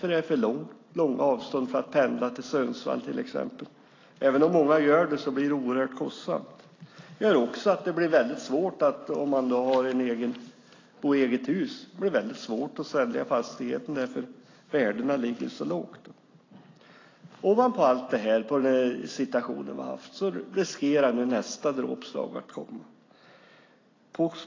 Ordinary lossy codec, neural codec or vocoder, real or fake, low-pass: AAC, 32 kbps; none; real; 7.2 kHz